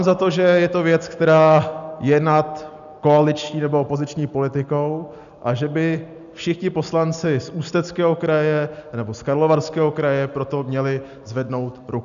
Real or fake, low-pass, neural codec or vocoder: real; 7.2 kHz; none